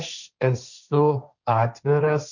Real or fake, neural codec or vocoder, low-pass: fake; codec, 16 kHz, 1.1 kbps, Voila-Tokenizer; 7.2 kHz